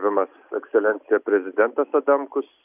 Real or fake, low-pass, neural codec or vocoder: real; 3.6 kHz; none